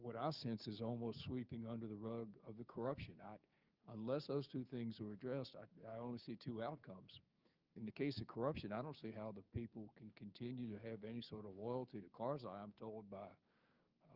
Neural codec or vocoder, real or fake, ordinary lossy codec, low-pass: codec, 16 kHz, 6 kbps, DAC; fake; Opus, 24 kbps; 5.4 kHz